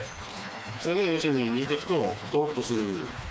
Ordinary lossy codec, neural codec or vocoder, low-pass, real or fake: none; codec, 16 kHz, 2 kbps, FreqCodec, smaller model; none; fake